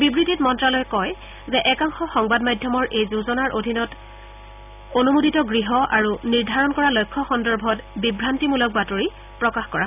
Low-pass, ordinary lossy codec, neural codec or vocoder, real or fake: 3.6 kHz; none; none; real